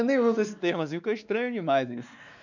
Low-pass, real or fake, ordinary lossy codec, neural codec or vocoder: 7.2 kHz; fake; none; codec, 16 kHz, 2 kbps, X-Codec, WavLM features, trained on Multilingual LibriSpeech